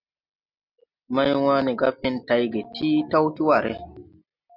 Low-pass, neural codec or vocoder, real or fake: 5.4 kHz; none; real